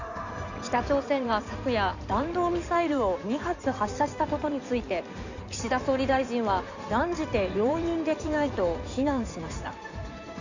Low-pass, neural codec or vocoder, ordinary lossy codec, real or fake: 7.2 kHz; codec, 16 kHz in and 24 kHz out, 2.2 kbps, FireRedTTS-2 codec; none; fake